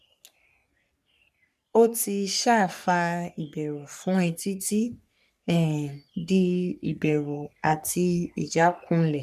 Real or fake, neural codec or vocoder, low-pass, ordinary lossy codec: fake; codec, 44.1 kHz, 3.4 kbps, Pupu-Codec; 14.4 kHz; none